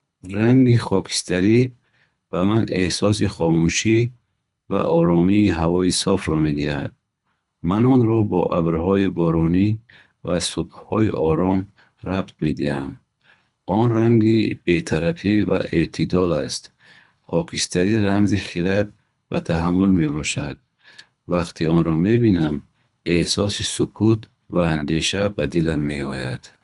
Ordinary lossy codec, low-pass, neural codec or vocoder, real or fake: none; 10.8 kHz; codec, 24 kHz, 3 kbps, HILCodec; fake